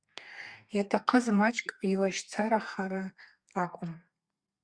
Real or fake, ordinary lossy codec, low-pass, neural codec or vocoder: fake; Opus, 64 kbps; 9.9 kHz; codec, 32 kHz, 1.9 kbps, SNAC